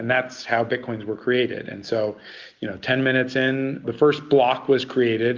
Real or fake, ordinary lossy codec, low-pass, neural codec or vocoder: real; Opus, 24 kbps; 7.2 kHz; none